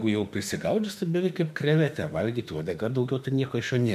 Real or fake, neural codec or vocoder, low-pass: fake; autoencoder, 48 kHz, 32 numbers a frame, DAC-VAE, trained on Japanese speech; 14.4 kHz